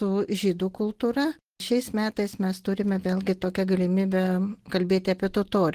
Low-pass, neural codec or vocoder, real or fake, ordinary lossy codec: 14.4 kHz; none; real; Opus, 16 kbps